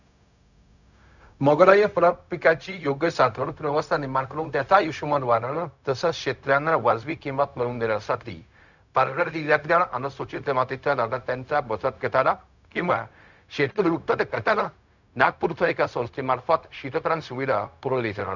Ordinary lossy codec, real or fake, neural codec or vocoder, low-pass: none; fake; codec, 16 kHz, 0.4 kbps, LongCat-Audio-Codec; 7.2 kHz